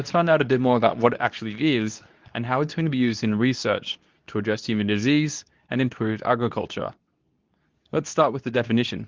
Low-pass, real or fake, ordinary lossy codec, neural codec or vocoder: 7.2 kHz; fake; Opus, 24 kbps; codec, 24 kHz, 0.9 kbps, WavTokenizer, medium speech release version 2